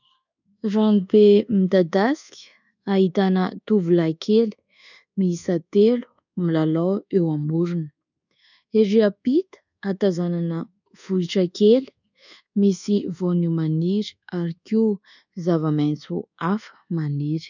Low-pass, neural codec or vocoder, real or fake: 7.2 kHz; codec, 24 kHz, 1.2 kbps, DualCodec; fake